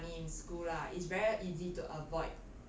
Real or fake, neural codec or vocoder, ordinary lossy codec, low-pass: real; none; none; none